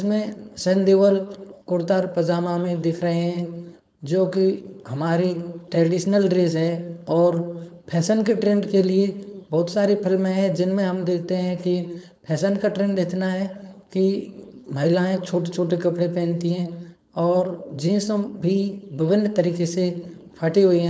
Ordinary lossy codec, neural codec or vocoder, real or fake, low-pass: none; codec, 16 kHz, 4.8 kbps, FACodec; fake; none